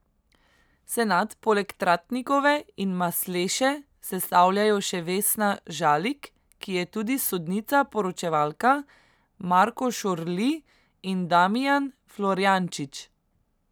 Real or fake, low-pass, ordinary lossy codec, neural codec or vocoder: real; none; none; none